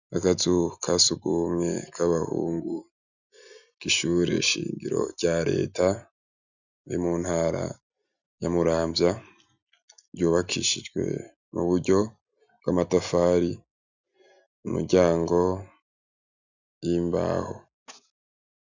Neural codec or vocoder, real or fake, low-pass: none; real; 7.2 kHz